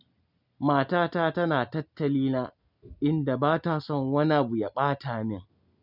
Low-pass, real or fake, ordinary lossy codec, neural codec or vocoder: 5.4 kHz; real; none; none